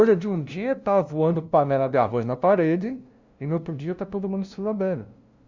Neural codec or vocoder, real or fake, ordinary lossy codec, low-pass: codec, 16 kHz, 0.5 kbps, FunCodec, trained on LibriTTS, 25 frames a second; fake; Opus, 64 kbps; 7.2 kHz